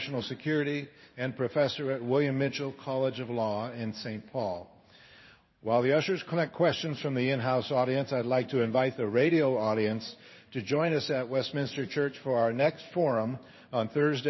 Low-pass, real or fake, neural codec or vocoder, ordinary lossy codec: 7.2 kHz; fake; codec, 16 kHz in and 24 kHz out, 1 kbps, XY-Tokenizer; MP3, 24 kbps